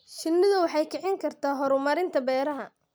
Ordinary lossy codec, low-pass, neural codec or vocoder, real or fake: none; none; none; real